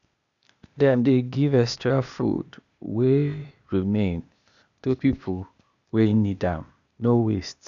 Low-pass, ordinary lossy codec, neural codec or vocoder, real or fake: 7.2 kHz; none; codec, 16 kHz, 0.8 kbps, ZipCodec; fake